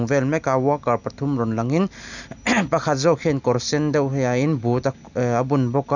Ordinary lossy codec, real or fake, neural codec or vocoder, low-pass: none; real; none; 7.2 kHz